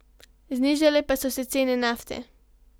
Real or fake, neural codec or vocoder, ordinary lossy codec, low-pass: real; none; none; none